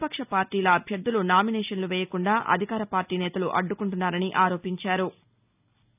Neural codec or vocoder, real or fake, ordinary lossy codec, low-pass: none; real; none; 3.6 kHz